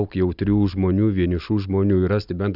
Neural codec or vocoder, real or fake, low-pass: vocoder, 44.1 kHz, 128 mel bands every 256 samples, BigVGAN v2; fake; 5.4 kHz